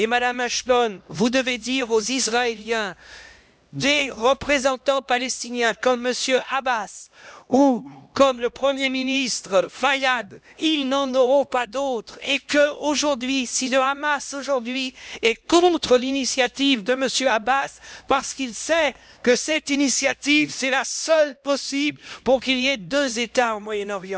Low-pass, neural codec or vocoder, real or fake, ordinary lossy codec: none; codec, 16 kHz, 1 kbps, X-Codec, HuBERT features, trained on LibriSpeech; fake; none